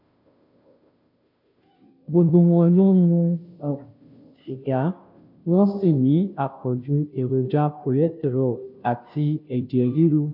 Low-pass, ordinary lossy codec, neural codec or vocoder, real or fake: 5.4 kHz; none; codec, 16 kHz, 0.5 kbps, FunCodec, trained on Chinese and English, 25 frames a second; fake